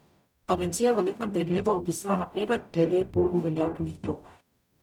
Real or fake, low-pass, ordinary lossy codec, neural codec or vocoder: fake; 19.8 kHz; none; codec, 44.1 kHz, 0.9 kbps, DAC